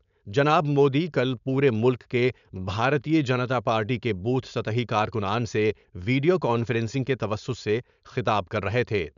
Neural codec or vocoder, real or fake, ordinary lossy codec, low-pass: codec, 16 kHz, 4.8 kbps, FACodec; fake; none; 7.2 kHz